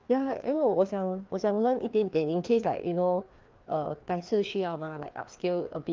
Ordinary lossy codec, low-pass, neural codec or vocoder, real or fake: Opus, 24 kbps; 7.2 kHz; codec, 16 kHz, 2 kbps, FreqCodec, larger model; fake